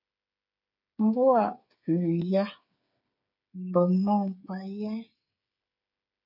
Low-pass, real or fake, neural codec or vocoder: 5.4 kHz; fake; codec, 16 kHz, 8 kbps, FreqCodec, smaller model